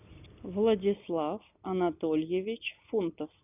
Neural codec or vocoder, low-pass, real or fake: none; 3.6 kHz; real